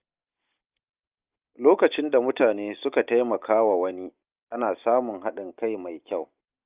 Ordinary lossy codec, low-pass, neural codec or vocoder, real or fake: Opus, 32 kbps; 3.6 kHz; none; real